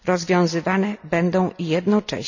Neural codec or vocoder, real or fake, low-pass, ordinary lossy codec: none; real; 7.2 kHz; none